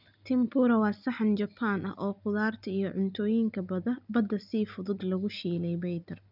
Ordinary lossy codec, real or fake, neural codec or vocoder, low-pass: none; real; none; 5.4 kHz